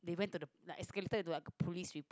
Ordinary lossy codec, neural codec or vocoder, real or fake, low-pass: none; none; real; none